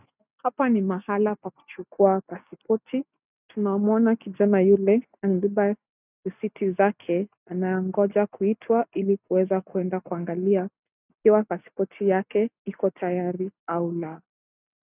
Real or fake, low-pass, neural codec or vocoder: real; 3.6 kHz; none